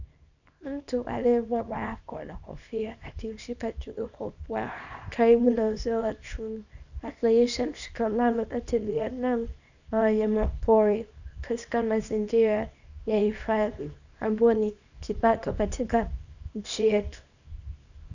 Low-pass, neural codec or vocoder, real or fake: 7.2 kHz; codec, 24 kHz, 0.9 kbps, WavTokenizer, small release; fake